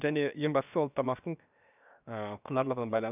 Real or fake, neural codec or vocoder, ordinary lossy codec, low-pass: fake; codec, 16 kHz, 0.7 kbps, FocalCodec; none; 3.6 kHz